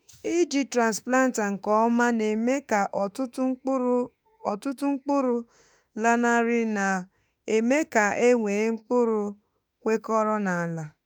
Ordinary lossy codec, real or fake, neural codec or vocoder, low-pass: none; fake; autoencoder, 48 kHz, 32 numbers a frame, DAC-VAE, trained on Japanese speech; none